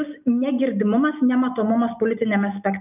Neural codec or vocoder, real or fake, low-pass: none; real; 3.6 kHz